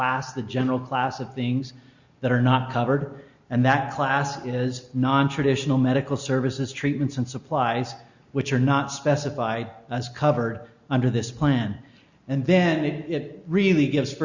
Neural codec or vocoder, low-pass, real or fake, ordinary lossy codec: none; 7.2 kHz; real; Opus, 64 kbps